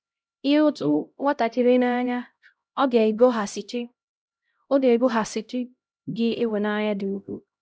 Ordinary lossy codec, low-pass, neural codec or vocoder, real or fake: none; none; codec, 16 kHz, 0.5 kbps, X-Codec, HuBERT features, trained on LibriSpeech; fake